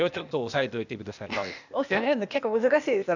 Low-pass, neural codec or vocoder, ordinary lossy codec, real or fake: 7.2 kHz; codec, 16 kHz, 0.8 kbps, ZipCodec; none; fake